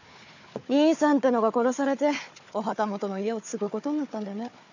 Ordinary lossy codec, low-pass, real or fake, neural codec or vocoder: none; 7.2 kHz; fake; codec, 16 kHz, 4 kbps, FunCodec, trained on Chinese and English, 50 frames a second